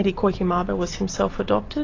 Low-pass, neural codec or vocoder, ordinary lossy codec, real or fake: 7.2 kHz; none; AAC, 32 kbps; real